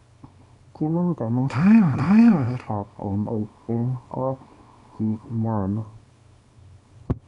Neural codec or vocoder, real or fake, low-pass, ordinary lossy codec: codec, 24 kHz, 0.9 kbps, WavTokenizer, small release; fake; 10.8 kHz; none